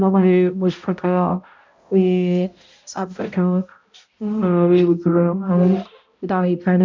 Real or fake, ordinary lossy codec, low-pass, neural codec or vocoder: fake; MP3, 64 kbps; 7.2 kHz; codec, 16 kHz, 0.5 kbps, X-Codec, HuBERT features, trained on balanced general audio